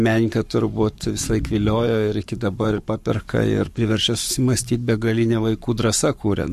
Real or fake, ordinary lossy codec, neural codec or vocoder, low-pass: fake; MP3, 64 kbps; codec, 44.1 kHz, 7.8 kbps, Pupu-Codec; 14.4 kHz